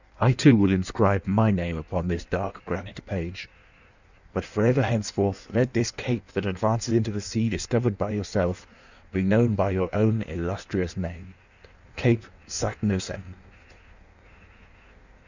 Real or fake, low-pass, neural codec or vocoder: fake; 7.2 kHz; codec, 16 kHz in and 24 kHz out, 1.1 kbps, FireRedTTS-2 codec